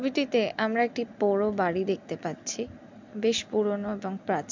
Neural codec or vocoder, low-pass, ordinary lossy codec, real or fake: none; 7.2 kHz; MP3, 64 kbps; real